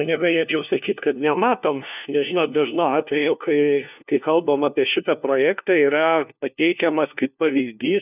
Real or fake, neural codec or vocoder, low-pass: fake; codec, 16 kHz, 1 kbps, FunCodec, trained on LibriTTS, 50 frames a second; 3.6 kHz